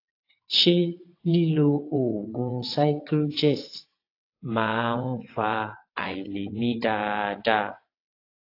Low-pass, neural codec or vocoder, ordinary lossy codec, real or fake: 5.4 kHz; vocoder, 22.05 kHz, 80 mel bands, WaveNeXt; AAC, 32 kbps; fake